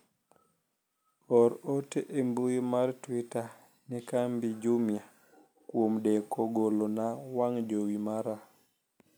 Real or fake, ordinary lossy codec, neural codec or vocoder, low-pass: real; none; none; none